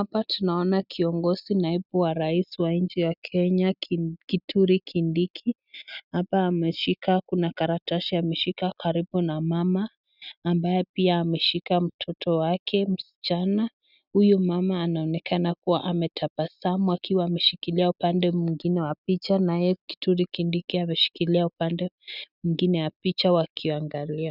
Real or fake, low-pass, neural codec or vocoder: real; 5.4 kHz; none